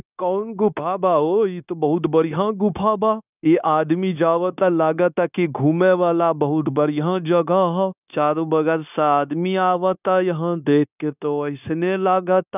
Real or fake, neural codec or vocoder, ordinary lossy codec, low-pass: fake; codec, 16 kHz, 0.9 kbps, LongCat-Audio-Codec; none; 3.6 kHz